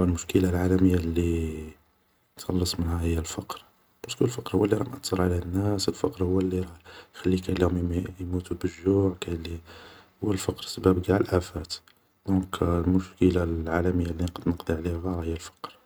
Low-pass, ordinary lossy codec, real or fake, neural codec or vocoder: none; none; real; none